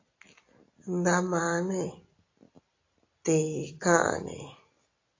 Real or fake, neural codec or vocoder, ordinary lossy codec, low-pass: real; none; AAC, 32 kbps; 7.2 kHz